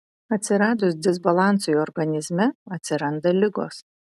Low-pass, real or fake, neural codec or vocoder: 14.4 kHz; real; none